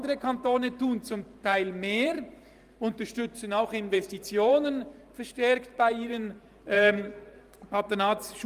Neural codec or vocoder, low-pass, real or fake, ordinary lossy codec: vocoder, 44.1 kHz, 128 mel bands every 512 samples, BigVGAN v2; 14.4 kHz; fake; Opus, 32 kbps